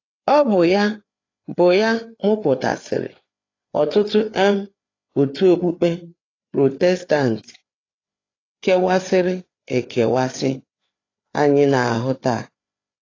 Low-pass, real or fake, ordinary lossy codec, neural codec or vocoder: 7.2 kHz; fake; AAC, 32 kbps; codec, 16 kHz, 4 kbps, FreqCodec, larger model